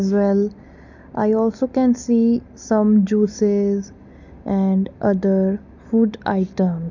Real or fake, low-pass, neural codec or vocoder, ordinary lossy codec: real; 7.2 kHz; none; none